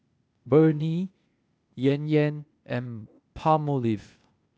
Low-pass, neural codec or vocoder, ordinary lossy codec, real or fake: none; codec, 16 kHz, 0.8 kbps, ZipCodec; none; fake